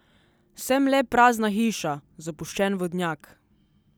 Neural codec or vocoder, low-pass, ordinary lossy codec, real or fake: none; none; none; real